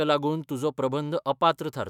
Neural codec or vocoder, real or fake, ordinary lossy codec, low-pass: autoencoder, 48 kHz, 128 numbers a frame, DAC-VAE, trained on Japanese speech; fake; none; 19.8 kHz